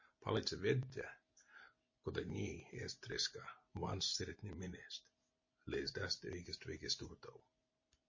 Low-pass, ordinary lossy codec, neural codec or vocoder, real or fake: 7.2 kHz; MP3, 32 kbps; none; real